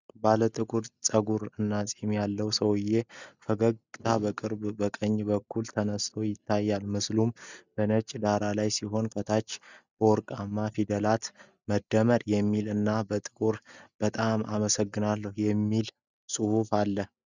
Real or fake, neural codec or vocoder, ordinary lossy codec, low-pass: real; none; Opus, 64 kbps; 7.2 kHz